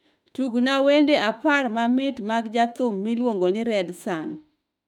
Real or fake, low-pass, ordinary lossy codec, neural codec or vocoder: fake; 19.8 kHz; none; autoencoder, 48 kHz, 32 numbers a frame, DAC-VAE, trained on Japanese speech